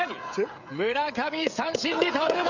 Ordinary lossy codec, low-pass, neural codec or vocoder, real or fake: none; 7.2 kHz; codec, 16 kHz, 16 kbps, FreqCodec, smaller model; fake